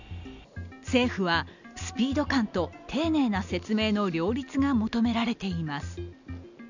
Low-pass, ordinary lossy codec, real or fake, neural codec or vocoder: 7.2 kHz; none; real; none